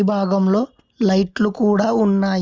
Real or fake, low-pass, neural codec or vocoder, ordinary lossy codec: real; 7.2 kHz; none; Opus, 32 kbps